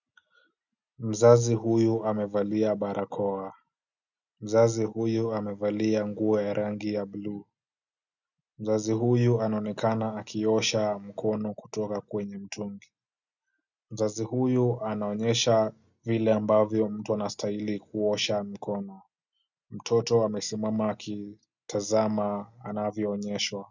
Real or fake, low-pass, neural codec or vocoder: real; 7.2 kHz; none